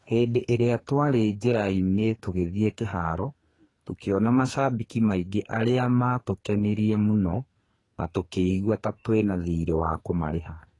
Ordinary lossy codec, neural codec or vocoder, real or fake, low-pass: AAC, 32 kbps; codec, 44.1 kHz, 2.6 kbps, SNAC; fake; 10.8 kHz